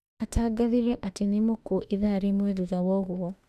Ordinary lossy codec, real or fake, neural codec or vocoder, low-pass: none; fake; autoencoder, 48 kHz, 32 numbers a frame, DAC-VAE, trained on Japanese speech; 14.4 kHz